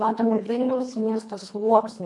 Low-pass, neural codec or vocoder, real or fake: 10.8 kHz; codec, 24 kHz, 1.5 kbps, HILCodec; fake